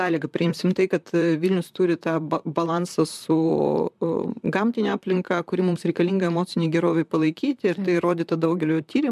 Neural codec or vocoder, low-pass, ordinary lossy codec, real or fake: vocoder, 44.1 kHz, 128 mel bands, Pupu-Vocoder; 14.4 kHz; MP3, 96 kbps; fake